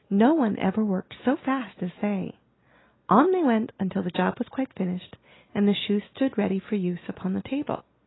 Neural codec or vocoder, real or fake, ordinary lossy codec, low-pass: none; real; AAC, 16 kbps; 7.2 kHz